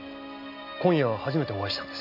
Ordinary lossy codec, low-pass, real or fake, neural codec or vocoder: none; 5.4 kHz; real; none